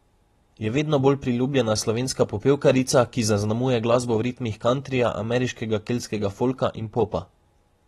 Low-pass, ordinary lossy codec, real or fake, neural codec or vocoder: 19.8 kHz; AAC, 32 kbps; real; none